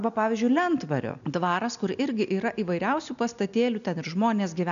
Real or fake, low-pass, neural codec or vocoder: real; 7.2 kHz; none